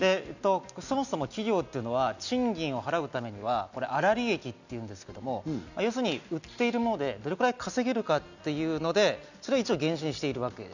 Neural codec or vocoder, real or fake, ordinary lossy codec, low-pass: none; real; none; 7.2 kHz